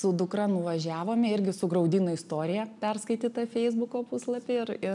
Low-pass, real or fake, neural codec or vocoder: 10.8 kHz; real; none